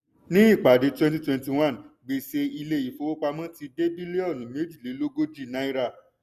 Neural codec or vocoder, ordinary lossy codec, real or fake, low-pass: none; none; real; 14.4 kHz